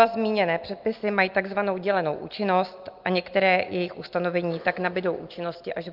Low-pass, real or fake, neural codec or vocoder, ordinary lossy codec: 5.4 kHz; real; none; Opus, 24 kbps